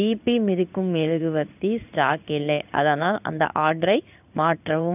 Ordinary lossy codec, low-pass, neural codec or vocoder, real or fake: none; 3.6 kHz; codec, 16 kHz in and 24 kHz out, 1 kbps, XY-Tokenizer; fake